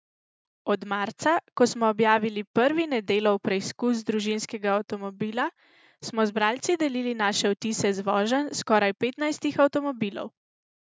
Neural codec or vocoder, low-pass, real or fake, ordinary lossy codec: none; none; real; none